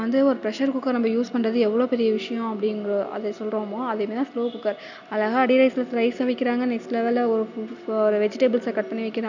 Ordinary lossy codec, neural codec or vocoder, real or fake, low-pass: none; none; real; 7.2 kHz